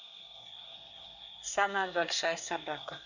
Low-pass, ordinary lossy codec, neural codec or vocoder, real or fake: 7.2 kHz; none; codec, 24 kHz, 1 kbps, SNAC; fake